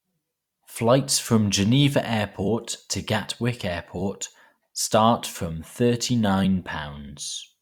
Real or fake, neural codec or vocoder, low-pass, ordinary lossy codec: fake; vocoder, 44.1 kHz, 128 mel bands every 256 samples, BigVGAN v2; 19.8 kHz; Opus, 64 kbps